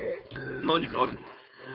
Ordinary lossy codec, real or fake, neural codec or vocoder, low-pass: none; fake; codec, 16 kHz, 4.8 kbps, FACodec; 5.4 kHz